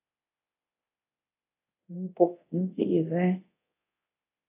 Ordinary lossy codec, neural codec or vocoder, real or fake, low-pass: AAC, 24 kbps; codec, 24 kHz, 0.9 kbps, DualCodec; fake; 3.6 kHz